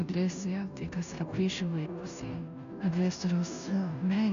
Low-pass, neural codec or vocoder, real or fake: 7.2 kHz; codec, 16 kHz, 0.5 kbps, FunCodec, trained on Chinese and English, 25 frames a second; fake